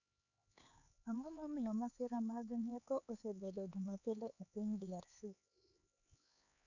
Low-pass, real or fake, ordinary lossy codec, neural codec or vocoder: 7.2 kHz; fake; none; codec, 16 kHz, 4 kbps, X-Codec, HuBERT features, trained on LibriSpeech